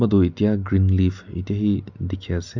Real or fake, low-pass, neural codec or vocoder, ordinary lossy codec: real; 7.2 kHz; none; none